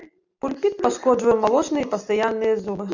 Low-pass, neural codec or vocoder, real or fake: 7.2 kHz; none; real